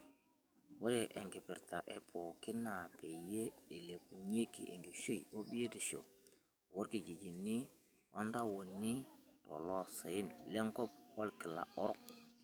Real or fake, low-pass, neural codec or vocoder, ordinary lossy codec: fake; none; codec, 44.1 kHz, 7.8 kbps, DAC; none